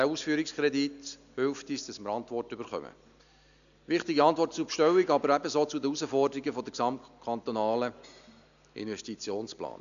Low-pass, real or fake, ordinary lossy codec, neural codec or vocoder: 7.2 kHz; real; none; none